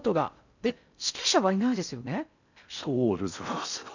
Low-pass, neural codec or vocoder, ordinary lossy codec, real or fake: 7.2 kHz; codec, 16 kHz in and 24 kHz out, 0.6 kbps, FocalCodec, streaming, 4096 codes; none; fake